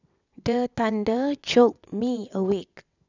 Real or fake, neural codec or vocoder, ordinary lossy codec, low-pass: fake; vocoder, 22.05 kHz, 80 mel bands, WaveNeXt; none; 7.2 kHz